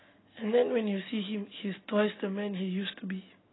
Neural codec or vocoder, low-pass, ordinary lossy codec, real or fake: none; 7.2 kHz; AAC, 16 kbps; real